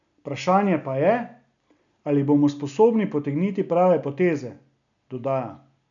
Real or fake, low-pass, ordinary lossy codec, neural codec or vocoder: real; 7.2 kHz; none; none